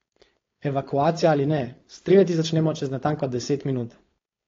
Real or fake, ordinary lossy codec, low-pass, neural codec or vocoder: fake; AAC, 32 kbps; 7.2 kHz; codec, 16 kHz, 4.8 kbps, FACodec